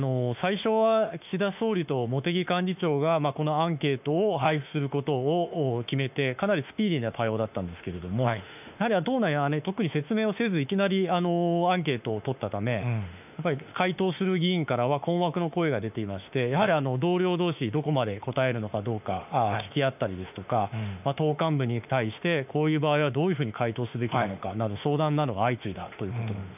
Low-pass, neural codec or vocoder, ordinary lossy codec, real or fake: 3.6 kHz; autoencoder, 48 kHz, 32 numbers a frame, DAC-VAE, trained on Japanese speech; none; fake